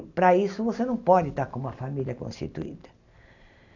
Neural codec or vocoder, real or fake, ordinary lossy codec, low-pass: none; real; none; 7.2 kHz